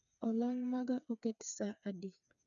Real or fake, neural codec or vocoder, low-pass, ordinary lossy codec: fake; codec, 16 kHz, 4 kbps, FreqCodec, smaller model; 7.2 kHz; none